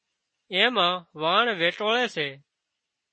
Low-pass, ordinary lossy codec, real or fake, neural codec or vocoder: 9.9 kHz; MP3, 32 kbps; real; none